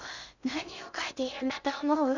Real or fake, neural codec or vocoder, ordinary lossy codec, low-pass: fake; codec, 16 kHz in and 24 kHz out, 0.6 kbps, FocalCodec, streaming, 2048 codes; none; 7.2 kHz